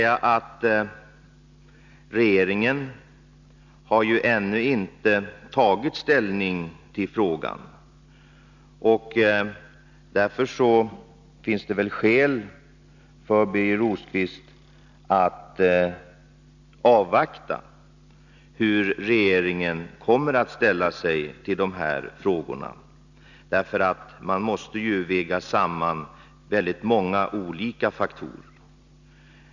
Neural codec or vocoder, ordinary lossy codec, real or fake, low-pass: none; none; real; 7.2 kHz